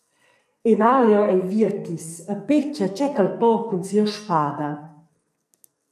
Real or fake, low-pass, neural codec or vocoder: fake; 14.4 kHz; codec, 44.1 kHz, 2.6 kbps, SNAC